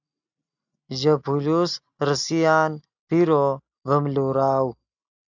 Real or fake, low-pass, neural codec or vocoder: real; 7.2 kHz; none